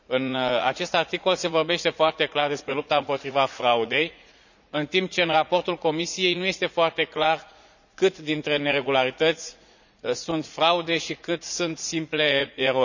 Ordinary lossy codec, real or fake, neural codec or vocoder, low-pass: none; fake; vocoder, 44.1 kHz, 80 mel bands, Vocos; 7.2 kHz